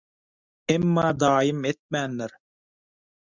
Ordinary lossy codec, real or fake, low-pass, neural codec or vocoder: Opus, 64 kbps; real; 7.2 kHz; none